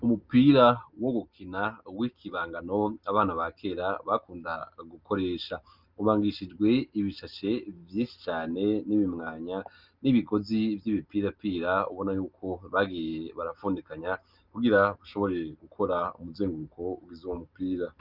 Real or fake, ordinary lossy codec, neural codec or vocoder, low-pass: real; Opus, 24 kbps; none; 5.4 kHz